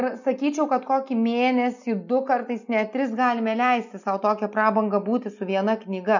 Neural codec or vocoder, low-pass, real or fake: none; 7.2 kHz; real